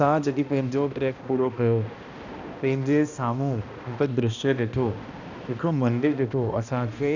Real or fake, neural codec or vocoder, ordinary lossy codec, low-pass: fake; codec, 16 kHz, 1 kbps, X-Codec, HuBERT features, trained on balanced general audio; none; 7.2 kHz